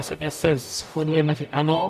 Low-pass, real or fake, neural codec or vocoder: 14.4 kHz; fake; codec, 44.1 kHz, 0.9 kbps, DAC